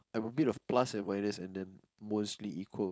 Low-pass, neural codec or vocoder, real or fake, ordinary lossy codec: none; codec, 16 kHz, 4.8 kbps, FACodec; fake; none